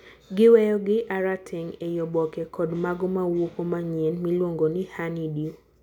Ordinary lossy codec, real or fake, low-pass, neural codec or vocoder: none; real; 19.8 kHz; none